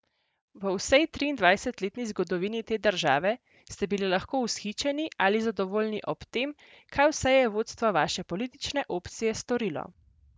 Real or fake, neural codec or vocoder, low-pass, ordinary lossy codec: real; none; none; none